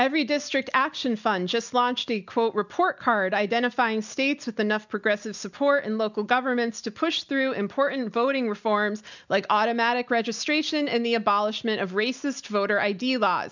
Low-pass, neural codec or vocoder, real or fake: 7.2 kHz; none; real